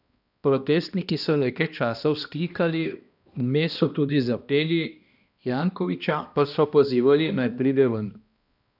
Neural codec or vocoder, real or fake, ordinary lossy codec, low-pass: codec, 16 kHz, 1 kbps, X-Codec, HuBERT features, trained on balanced general audio; fake; none; 5.4 kHz